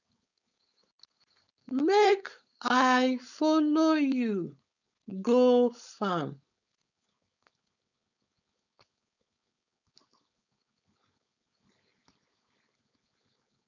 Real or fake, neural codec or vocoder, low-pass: fake; codec, 16 kHz, 4.8 kbps, FACodec; 7.2 kHz